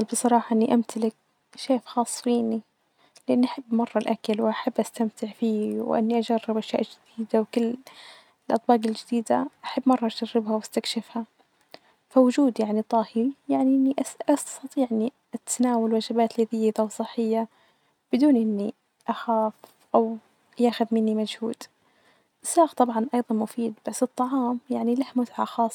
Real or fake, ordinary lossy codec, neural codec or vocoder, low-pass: real; none; none; 19.8 kHz